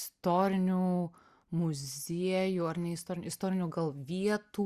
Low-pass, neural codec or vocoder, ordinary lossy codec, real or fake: 14.4 kHz; none; Opus, 64 kbps; real